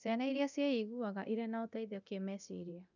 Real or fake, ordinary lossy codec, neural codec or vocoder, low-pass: fake; none; codec, 24 kHz, 0.9 kbps, DualCodec; 7.2 kHz